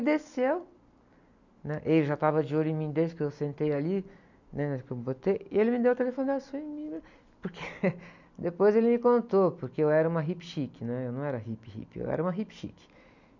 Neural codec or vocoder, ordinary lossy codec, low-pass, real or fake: none; none; 7.2 kHz; real